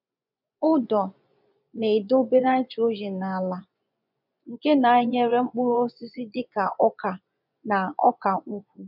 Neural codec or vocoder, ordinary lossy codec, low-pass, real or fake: vocoder, 24 kHz, 100 mel bands, Vocos; none; 5.4 kHz; fake